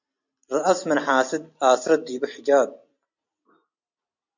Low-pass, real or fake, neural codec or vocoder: 7.2 kHz; real; none